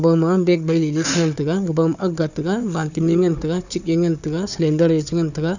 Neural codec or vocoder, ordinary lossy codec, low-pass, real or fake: codec, 16 kHz, 4 kbps, FunCodec, trained on Chinese and English, 50 frames a second; none; 7.2 kHz; fake